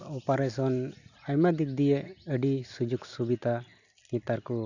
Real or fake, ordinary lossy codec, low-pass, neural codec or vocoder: real; none; 7.2 kHz; none